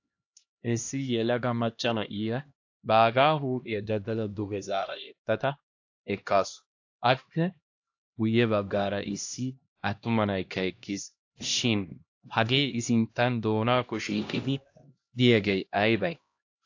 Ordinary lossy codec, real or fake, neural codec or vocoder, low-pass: AAC, 48 kbps; fake; codec, 16 kHz, 1 kbps, X-Codec, HuBERT features, trained on LibriSpeech; 7.2 kHz